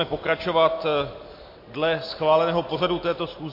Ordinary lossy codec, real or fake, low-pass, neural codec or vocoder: MP3, 32 kbps; real; 5.4 kHz; none